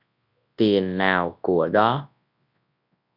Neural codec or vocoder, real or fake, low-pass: codec, 24 kHz, 0.9 kbps, WavTokenizer, large speech release; fake; 5.4 kHz